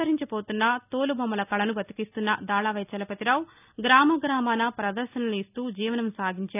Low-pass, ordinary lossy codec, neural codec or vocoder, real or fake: 3.6 kHz; none; none; real